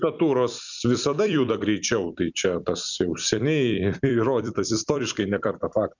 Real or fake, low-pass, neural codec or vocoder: fake; 7.2 kHz; vocoder, 44.1 kHz, 128 mel bands every 256 samples, BigVGAN v2